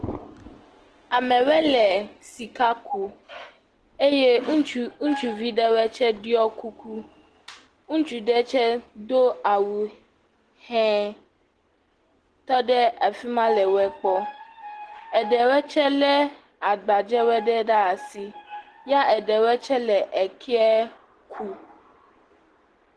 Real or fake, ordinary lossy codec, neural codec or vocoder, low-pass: real; Opus, 16 kbps; none; 10.8 kHz